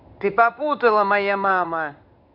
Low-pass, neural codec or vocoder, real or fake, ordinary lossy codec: 5.4 kHz; codec, 16 kHz in and 24 kHz out, 1 kbps, XY-Tokenizer; fake; none